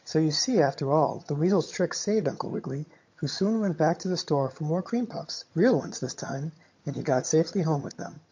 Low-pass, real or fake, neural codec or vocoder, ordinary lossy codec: 7.2 kHz; fake; vocoder, 22.05 kHz, 80 mel bands, HiFi-GAN; MP3, 48 kbps